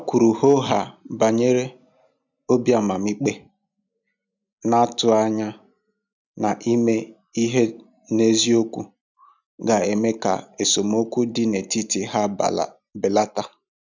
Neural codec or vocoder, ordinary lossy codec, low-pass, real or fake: none; none; 7.2 kHz; real